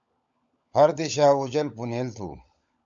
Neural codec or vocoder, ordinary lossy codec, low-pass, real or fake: codec, 16 kHz, 16 kbps, FunCodec, trained on LibriTTS, 50 frames a second; MP3, 64 kbps; 7.2 kHz; fake